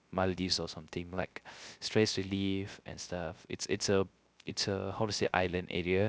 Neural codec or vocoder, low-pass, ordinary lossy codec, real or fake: codec, 16 kHz, 0.3 kbps, FocalCodec; none; none; fake